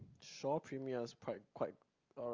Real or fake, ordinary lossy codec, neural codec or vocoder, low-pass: real; Opus, 32 kbps; none; 7.2 kHz